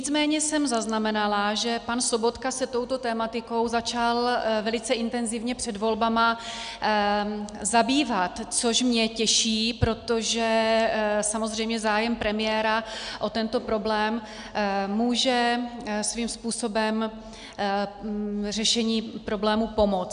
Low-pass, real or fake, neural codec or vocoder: 9.9 kHz; real; none